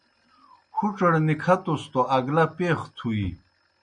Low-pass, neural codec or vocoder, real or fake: 9.9 kHz; none; real